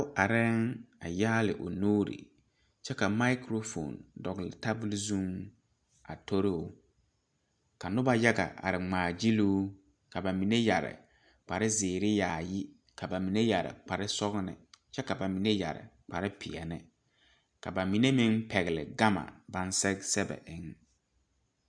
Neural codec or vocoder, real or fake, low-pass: none; real; 9.9 kHz